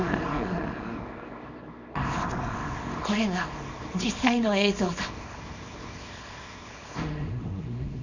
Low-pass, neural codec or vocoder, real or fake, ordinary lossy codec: 7.2 kHz; codec, 24 kHz, 0.9 kbps, WavTokenizer, small release; fake; none